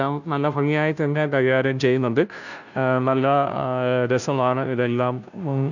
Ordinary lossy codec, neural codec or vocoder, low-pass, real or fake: none; codec, 16 kHz, 0.5 kbps, FunCodec, trained on Chinese and English, 25 frames a second; 7.2 kHz; fake